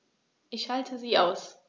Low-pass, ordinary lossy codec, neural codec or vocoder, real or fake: none; none; none; real